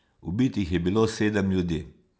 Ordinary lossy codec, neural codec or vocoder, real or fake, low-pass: none; none; real; none